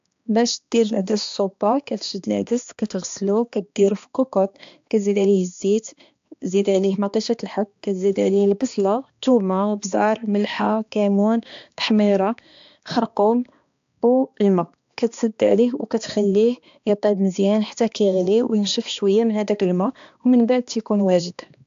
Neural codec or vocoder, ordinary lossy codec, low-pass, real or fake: codec, 16 kHz, 2 kbps, X-Codec, HuBERT features, trained on balanced general audio; AAC, 64 kbps; 7.2 kHz; fake